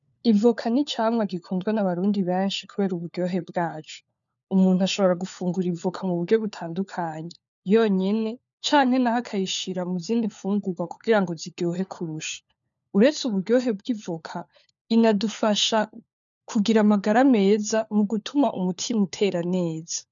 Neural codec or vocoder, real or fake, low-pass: codec, 16 kHz, 4 kbps, FunCodec, trained on LibriTTS, 50 frames a second; fake; 7.2 kHz